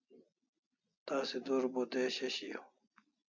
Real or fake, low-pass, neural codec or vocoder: real; 7.2 kHz; none